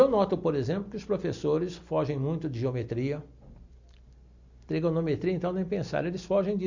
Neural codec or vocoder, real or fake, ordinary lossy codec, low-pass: none; real; Opus, 64 kbps; 7.2 kHz